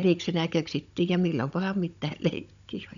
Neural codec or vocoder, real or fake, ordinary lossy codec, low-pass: codec, 16 kHz, 16 kbps, FunCodec, trained on Chinese and English, 50 frames a second; fake; none; 7.2 kHz